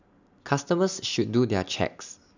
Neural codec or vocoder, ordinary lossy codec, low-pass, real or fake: none; none; 7.2 kHz; real